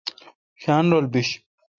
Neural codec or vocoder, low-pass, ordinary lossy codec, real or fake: none; 7.2 kHz; MP3, 64 kbps; real